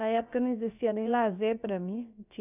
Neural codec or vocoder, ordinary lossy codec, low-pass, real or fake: codec, 16 kHz, about 1 kbps, DyCAST, with the encoder's durations; none; 3.6 kHz; fake